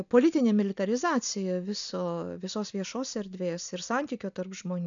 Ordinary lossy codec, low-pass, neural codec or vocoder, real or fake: MP3, 96 kbps; 7.2 kHz; none; real